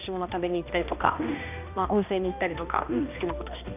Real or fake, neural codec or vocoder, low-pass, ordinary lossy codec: fake; codec, 16 kHz, 1 kbps, X-Codec, HuBERT features, trained on balanced general audio; 3.6 kHz; none